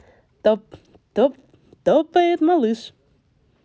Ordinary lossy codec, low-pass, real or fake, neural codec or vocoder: none; none; real; none